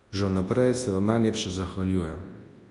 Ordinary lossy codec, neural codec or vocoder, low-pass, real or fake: AAC, 32 kbps; codec, 24 kHz, 0.9 kbps, WavTokenizer, large speech release; 10.8 kHz; fake